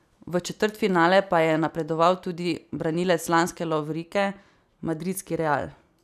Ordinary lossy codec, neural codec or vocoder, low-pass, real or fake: none; none; 14.4 kHz; real